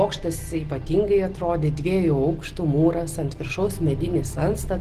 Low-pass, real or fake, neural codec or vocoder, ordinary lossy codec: 14.4 kHz; real; none; Opus, 16 kbps